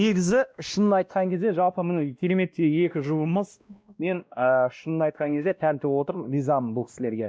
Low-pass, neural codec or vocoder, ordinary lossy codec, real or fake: none; codec, 16 kHz, 1 kbps, X-Codec, WavLM features, trained on Multilingual LibriSpeech; none; fake